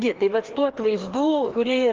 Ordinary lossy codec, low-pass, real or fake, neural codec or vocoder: Opus, 16 kbps; 7.2 kHz; fake; codec, 16 kHz, 2 kbps, FreqCodec, larger model